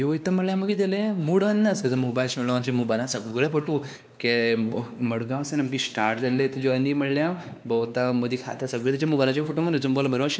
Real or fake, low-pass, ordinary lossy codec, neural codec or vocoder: fake; none; none; codec, 16 kHz, 2 kbps, X-Codec, WavLM features, trained on Multilingual LibriSpeech